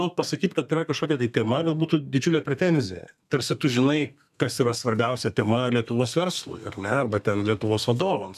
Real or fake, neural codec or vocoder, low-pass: fake; codec, 32 kHz, 1.9 kbps, SNAC; 14.4 kHz